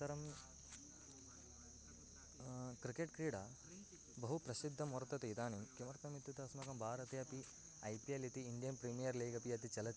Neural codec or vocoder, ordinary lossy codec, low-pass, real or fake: none; none; none; real